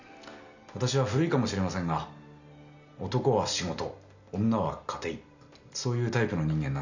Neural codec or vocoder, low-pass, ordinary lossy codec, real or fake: none; 7.2 kHz; none; real